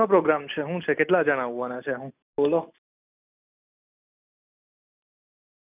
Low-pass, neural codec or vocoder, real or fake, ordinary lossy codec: 3.6 kHz; none; real; none